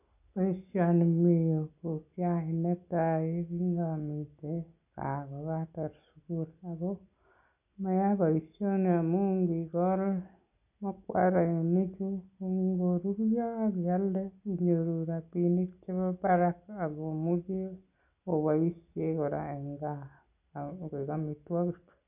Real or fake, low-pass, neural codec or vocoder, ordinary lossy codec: real; 3.6 kHz; none; none